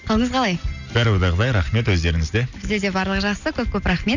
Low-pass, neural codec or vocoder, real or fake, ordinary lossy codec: 7.2 kHz; none; real; none